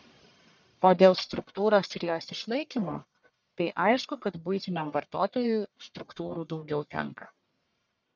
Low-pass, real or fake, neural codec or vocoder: 7.2 kHz; fake; codec, 44.1 kHz, 1.7 kbps, Pupu-Codec